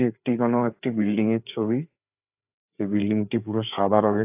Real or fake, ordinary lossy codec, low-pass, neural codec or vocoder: fake; AAC, 32 kbps; 3.6 kHz; codec, 16 kHz, 4 kbps, FreqCodec, larger model